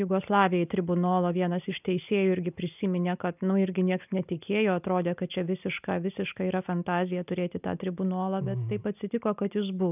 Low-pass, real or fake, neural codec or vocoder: 3.6 kHz; real; none